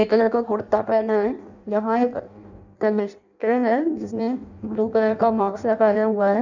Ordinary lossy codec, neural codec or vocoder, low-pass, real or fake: none; codec, 16 kHz in and 24 kHz out, 0.6 kbps, FireRedTTS-2 codec; 7.2 kHz; fake